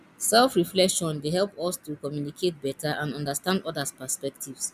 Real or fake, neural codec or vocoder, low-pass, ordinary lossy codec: real; none; 14.4 kHz; none